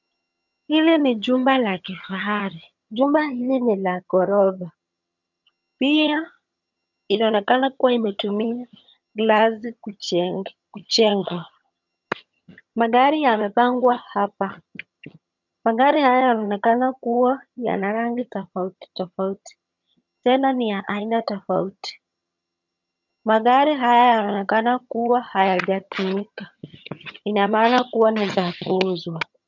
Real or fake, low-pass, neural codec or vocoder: fake; 7.2 kHz; vocoder, 22.05 kHz, 80 mel bands, HiFi-GAN